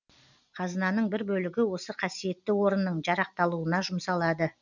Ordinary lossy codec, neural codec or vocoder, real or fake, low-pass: none; none; real; 7.2 kHz